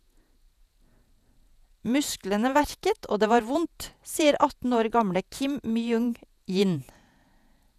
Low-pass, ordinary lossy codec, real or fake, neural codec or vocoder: 14.4 kHz; none; fake; vocoder, 48 kHz, 128 mel bands, Vocos